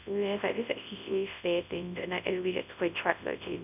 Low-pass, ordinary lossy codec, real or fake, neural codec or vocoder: 3.6 kHz; none; fake; codec, 24 kHz, 0.9 kbps, WavTokenizer, large speech release